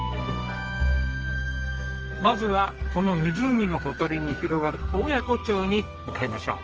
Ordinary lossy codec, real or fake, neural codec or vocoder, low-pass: Opus, 24 kbps; fake; codec, 32 kHz, 1.9 kbps, SNAC; 7.2 kHz